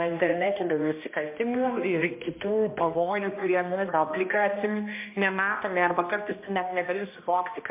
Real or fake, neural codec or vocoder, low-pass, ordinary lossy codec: fake; codec, 16 kHz, 1 kbps, X-Codec, HuBERT features, trained on balanced general audio; 3.6 kHz; MP3, 24 kbps